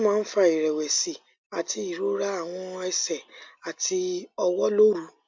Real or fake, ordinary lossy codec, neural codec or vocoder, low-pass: real; MP3, 48 kbps; none; 7.2 kHz